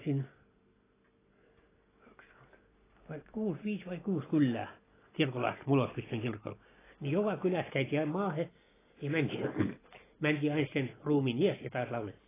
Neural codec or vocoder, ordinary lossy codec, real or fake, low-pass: none; AAC, 16 kbps; real; 3.6 kHz